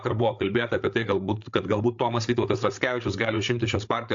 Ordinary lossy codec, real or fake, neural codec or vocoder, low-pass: AAC, 64 kbps; fake; codec, 16 kHz, 8 kbps, FreqCodec, larger model; 7.2 kHz